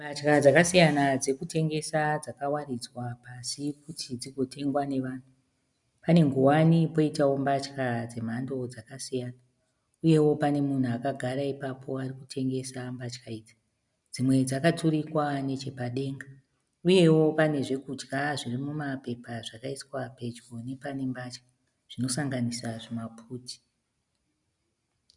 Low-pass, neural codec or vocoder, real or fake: 10.8 kHz; none; real